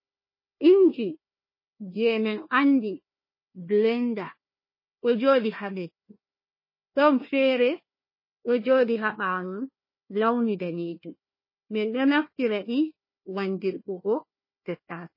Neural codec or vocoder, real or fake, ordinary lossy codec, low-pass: codec, 16 kHz, 1 kbps, FunCodec, trained on Chinese and English, 50 frames a second; fake; MP3, 24 kbps; 5.4 kHz